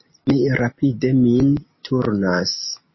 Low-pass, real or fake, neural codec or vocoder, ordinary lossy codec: 7.2 kHz; real; none; MP3, 24 kbps